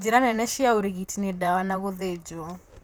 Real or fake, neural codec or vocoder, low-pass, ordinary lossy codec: fake; vocoder, 44.1 kHz, 128 mel bands, Pupu-Vocoder; none; none